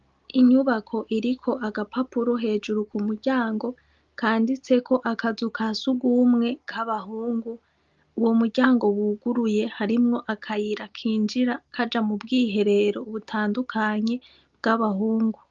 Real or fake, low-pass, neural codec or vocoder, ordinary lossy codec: real; 7.2 kHz; none; Opus, 32 kbps